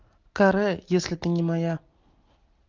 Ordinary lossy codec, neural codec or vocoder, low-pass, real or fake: Opus, 24 kbps; none; 7.2 kHz; real